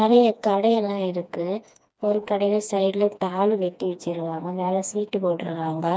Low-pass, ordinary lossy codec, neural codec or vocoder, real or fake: none; none; codec, 16 kHz, 2 kbps, FreqCodec, smaller model; fake